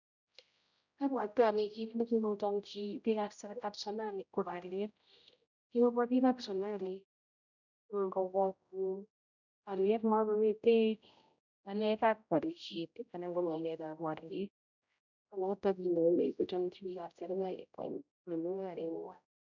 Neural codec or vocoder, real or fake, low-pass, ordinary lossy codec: codec, 16 kHz, 0.5 kbps, X-Codec, HuBERT features, trained on general audio; fake; 7.2 kHz; none